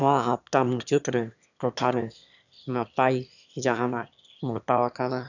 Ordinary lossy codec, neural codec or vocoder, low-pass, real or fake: none; autoencoder, 22.05 kHz, a latent of 192 numbers a frame, VITS, trained on one speaker; 7.2 kHz; fake